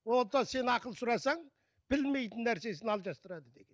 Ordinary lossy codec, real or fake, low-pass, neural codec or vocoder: none; real; none; none